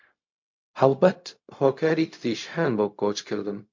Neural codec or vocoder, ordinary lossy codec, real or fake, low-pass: codec, 16 kHz, 0.4 kbps, LongCat-Audio-Codec; MP3, 48 kbps; fake; 7.2 kHz